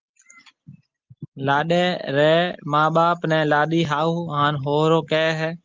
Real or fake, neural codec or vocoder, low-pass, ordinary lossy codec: real; none; 7.2 kHz; Opus, 32 kbps